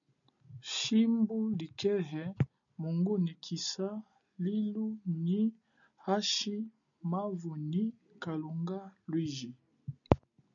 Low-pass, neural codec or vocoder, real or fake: 7.2 kHz; none; real